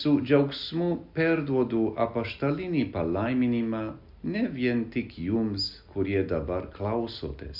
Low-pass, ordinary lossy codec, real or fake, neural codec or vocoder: 5.4 kHz; MP3, 48 kbps; real; none